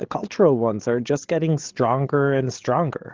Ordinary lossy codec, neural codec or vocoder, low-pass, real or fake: Opus, 16 kbps; codec, 16 kHz, 8 kbps, FreqCodec, larger model; 7.2 kHz; fake